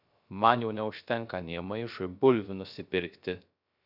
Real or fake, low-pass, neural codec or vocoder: fake; 5.4 kHz; codec, 16 kHz, 0.3 kbps, FocalCodec